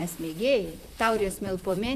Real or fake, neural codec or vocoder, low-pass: fake; vocoder, 44.1 kHz, 128 mel bands, Pupu-Vocoder; 14.4 kHz